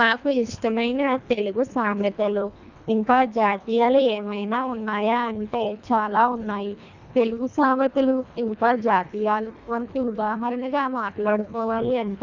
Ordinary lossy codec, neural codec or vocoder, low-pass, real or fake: none; codec, 24 kHz, 1.5 kbps, HILCodec; 7.2 kHz; fake